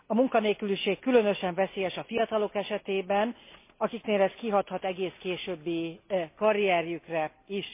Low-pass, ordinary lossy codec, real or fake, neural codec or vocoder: 3.6 kHz; MP3, 24 kbps; real; none